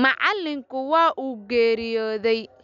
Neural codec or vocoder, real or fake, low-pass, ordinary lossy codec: none; real; 7.2 kHz; none